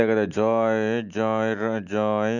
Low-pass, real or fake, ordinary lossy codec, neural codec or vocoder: 7.2 kHz; real; none; none